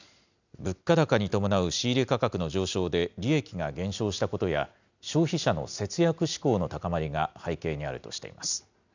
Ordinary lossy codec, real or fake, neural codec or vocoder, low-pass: none; real; none; 7.2 kHz